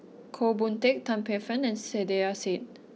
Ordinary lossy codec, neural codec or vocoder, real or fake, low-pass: none; none; real; none